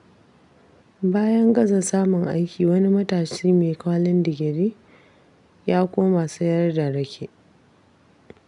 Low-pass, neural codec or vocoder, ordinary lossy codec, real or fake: 10.8 kHz; none; none; real